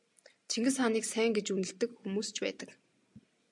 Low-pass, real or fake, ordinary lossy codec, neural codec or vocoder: 10.8 kHz; real; MP3, 96 kbps; none